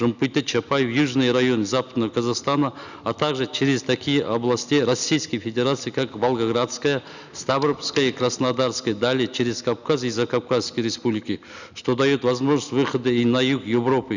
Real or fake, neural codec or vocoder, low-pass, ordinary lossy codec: real; none; 7.2 kHz; none